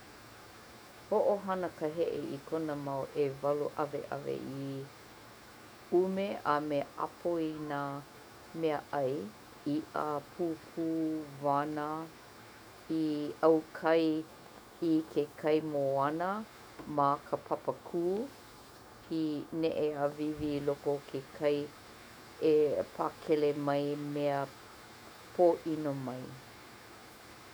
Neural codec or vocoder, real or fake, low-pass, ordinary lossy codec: autoencoder, 48 kHz, 128 numbers a frame, DAC-VAE, trained on Japanese speech; fake; none; none